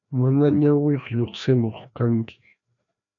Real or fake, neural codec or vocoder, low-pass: fake; codec, 16 kHz, 1 kbps, FreqCodec, larger model; 7.2 kHz